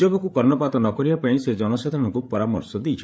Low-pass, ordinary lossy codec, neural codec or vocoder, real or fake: none; none; codec, 16 kHz, 8 kbps, FreqCodec, larger model; fake